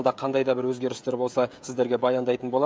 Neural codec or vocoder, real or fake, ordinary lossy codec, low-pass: codec, 16 kHz, 16 kbps, FreqCodec, smaller model; fake; none; none